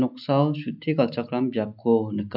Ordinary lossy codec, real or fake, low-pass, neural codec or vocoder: none; real; 5.4 kHz; none